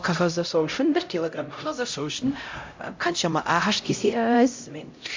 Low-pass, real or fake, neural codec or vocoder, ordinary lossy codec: 7.2 kHz; fake; codec, 16 kHz, 0.5 kbps, X-Codec, HuBERT features, trained on LibriSpeech; MP3, 48 kbps